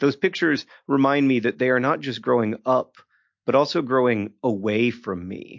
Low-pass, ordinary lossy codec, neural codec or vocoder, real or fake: 7.2 kHz; MP3, 48 kbps; none; real